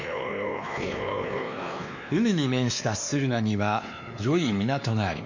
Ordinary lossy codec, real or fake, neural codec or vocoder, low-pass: none; fake; codec, 16 kHz, 2 kbps, X-Codec, WavLM features, trained on Multilingual LibriSpeech; 7.2 kHz